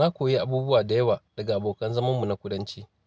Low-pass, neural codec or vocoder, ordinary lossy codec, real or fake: none; none; none; real